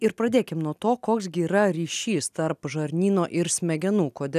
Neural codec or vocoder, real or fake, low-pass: none; real; 14.4 kHz